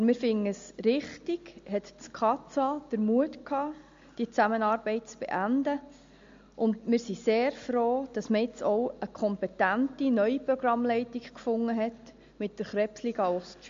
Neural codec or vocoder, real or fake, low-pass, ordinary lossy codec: none; real; 7.2 kHz; MP3, 48 kbps